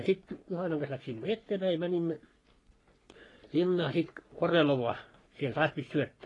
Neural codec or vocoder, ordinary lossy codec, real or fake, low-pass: codec, 44.1 kHz, 3.4 kbps, Pupu-Codec; AAC, 32 kbps; fake; 10.8 kHz